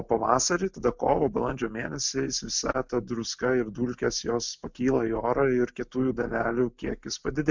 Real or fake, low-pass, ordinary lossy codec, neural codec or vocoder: real; 7.2 kHz; MP3, 64 kbps; none